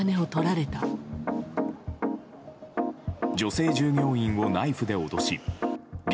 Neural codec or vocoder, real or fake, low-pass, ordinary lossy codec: none; real; none; none